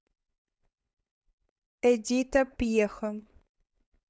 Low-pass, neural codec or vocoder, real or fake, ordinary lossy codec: none; codec, 16 kHz, 4.8 kbps, FACodec; fake; none